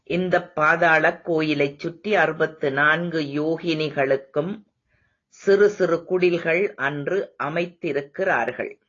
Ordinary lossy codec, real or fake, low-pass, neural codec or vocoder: AAC, 32 kbps; real; 7.2 kHz; none